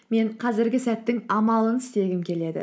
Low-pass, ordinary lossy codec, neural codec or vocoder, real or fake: none; none; none; real